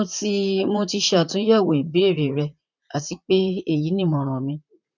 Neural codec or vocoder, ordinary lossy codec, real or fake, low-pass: vocoder, 44.1 kHz, 128 mel bands, Pupu-Vocoder; none; fake; 7.2 kHz